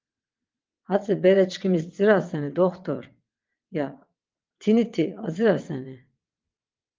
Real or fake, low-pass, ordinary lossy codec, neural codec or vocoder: fake; 7.2 kHz; Opus, 24 kbps; vocoder, 22.05 kHz, 80 mel bands, WaveNeXt